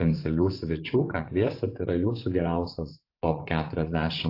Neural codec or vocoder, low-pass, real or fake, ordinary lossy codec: vocoder, 24 kHz, 100 mel bands, Vocos; 5.4 kHz; fake; AAC, 32 kbps